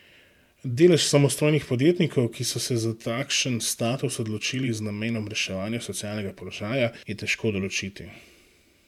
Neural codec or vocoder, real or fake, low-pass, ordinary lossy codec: vocoder, 44.1 kHz, 128 mel bands every 512 samples, BigVGAN v2; fake; 19.8 kHz; MP3, 96 kbps